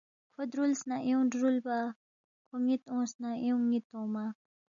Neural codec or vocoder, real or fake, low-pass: none; real; 7.2 kHz